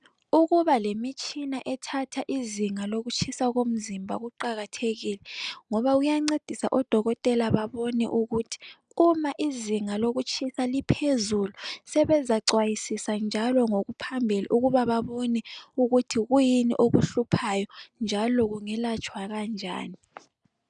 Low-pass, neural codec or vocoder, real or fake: 9.9 kHz; none; real